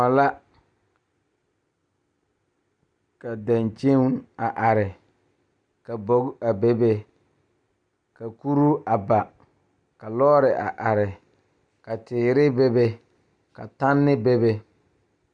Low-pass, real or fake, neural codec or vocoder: 9.9 kHz; real; none